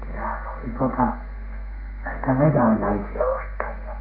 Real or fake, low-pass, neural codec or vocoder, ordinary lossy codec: fake; 5.4 kHz; codec, 32 kHz, 1.9 kbps, SNAC; none